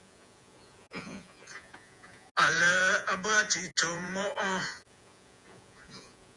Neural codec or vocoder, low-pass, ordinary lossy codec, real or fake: vocoder, 48 kHz, 128 mel bands, Vocos; 10.8 kHz; Opus, 64 kbps; fake